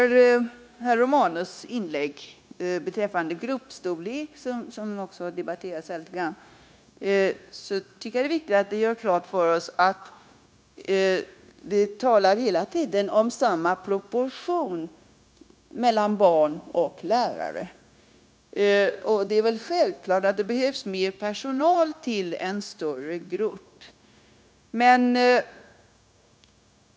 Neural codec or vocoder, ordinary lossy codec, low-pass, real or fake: codec, 16 kHz, 0.9 kbps, LongCat-Audio-Codec; none; none; fake